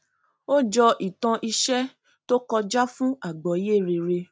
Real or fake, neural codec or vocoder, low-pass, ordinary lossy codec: real; none; none; none